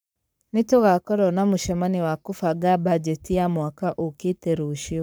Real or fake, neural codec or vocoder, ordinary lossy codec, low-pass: fake; codec, 44.1 kHz, 7.8 kbps, Pupu-Codec; none; none